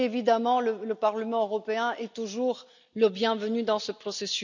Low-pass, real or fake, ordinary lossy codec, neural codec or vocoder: 7.2 kHz; real; none; none